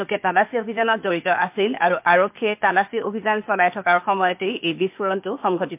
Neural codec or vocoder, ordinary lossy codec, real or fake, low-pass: codec, 16 kHz, 0.8 kbps, ZipCodec; MP3, 32 kbps; fake; 3.6 kHz